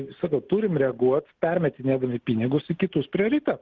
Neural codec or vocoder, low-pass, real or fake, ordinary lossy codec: none; 7.2 kHz; real; Opus, 16 kbps